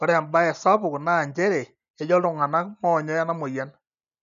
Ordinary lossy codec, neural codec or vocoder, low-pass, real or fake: none; none; 7.2 kHz; real